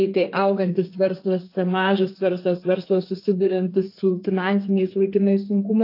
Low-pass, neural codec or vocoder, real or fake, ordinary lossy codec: 5.4 kHz; codec, 32 kHz, 1.9 kbps, SNAC; fake; AAC, 32 kbps